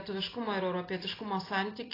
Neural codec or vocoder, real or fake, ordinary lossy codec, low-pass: none; real; AAC, 24 kbps; 5.4 kHz